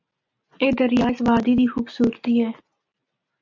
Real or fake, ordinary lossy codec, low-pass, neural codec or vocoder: real; MP3, 64 kbps; 7.2 kHz; none